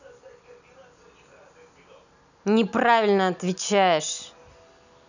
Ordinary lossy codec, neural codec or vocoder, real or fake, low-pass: none; none; real; 7.2 kHz